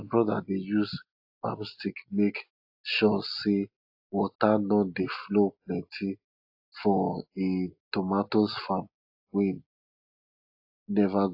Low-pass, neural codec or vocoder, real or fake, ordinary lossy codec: 5.4 kHz; none; real; AAC, 48 kbps